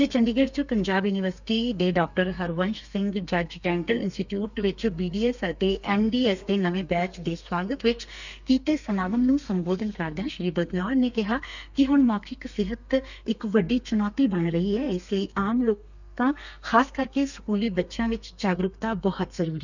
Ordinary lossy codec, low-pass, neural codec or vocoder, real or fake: none; 7.2 kHz; codec, 32 kHz, 1.9 kbps, SNAC; fake